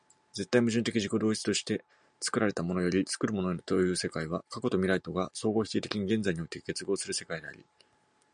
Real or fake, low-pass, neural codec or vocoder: real; 9.9 kHz; none